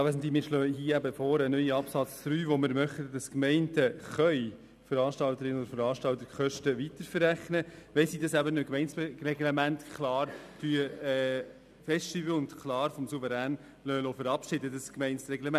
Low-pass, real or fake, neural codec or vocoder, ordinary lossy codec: 14.4 kHz; real; none; none